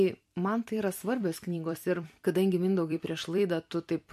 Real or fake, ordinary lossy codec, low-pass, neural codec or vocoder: fake; MP3, 64 kbps; 14.4 kHz; vocoder, 44.1 kHz, 128 mel bands every 256 samples, BigVGAN v2